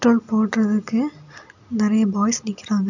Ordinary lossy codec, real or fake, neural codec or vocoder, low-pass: none; real; none; 7.2 kHz